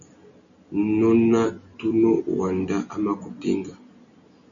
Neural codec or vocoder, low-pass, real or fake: none; 7.2 kHz; real